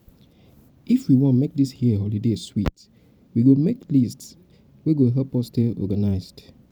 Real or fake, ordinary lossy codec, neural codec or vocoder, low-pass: fake; none; vocoder, 44.1 kHz, 128 mel bands every 512 samples, BigVGAN v2; 19.8 kHz